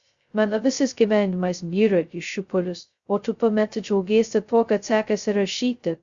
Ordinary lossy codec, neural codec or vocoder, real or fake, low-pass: Opus, 64 kbps; codec, 16 kHz, 0.2 kbps, FocalCodec; fake; 7.2 kHz